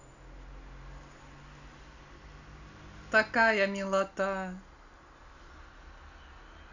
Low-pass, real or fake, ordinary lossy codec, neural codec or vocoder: 7.2 kHz; real; none; none